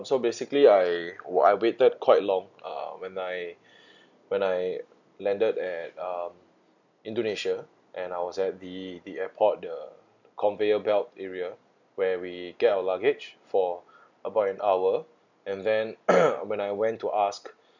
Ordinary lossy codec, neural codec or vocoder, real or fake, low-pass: none; none; real; 7.2 kHz